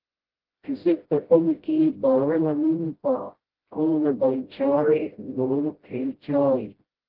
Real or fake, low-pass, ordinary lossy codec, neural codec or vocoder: fake; 5.4 kHz; Opus, 16 kbps; codec, 16 kHz, 0.5 kbps, FreqCodec, smaller model